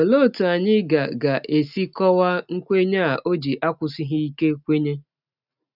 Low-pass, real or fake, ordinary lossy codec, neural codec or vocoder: 5.4 kHz; real; none; none